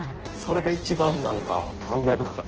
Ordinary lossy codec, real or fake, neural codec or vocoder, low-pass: Opus, 16 kbps; fake; codec, 16 kHz in and 24 kHz out, 0.6 kbps, FireRedTTS-2 codec; 7.2 kHz